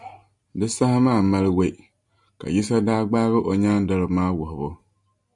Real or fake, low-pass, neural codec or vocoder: real; 10.8 kHz; none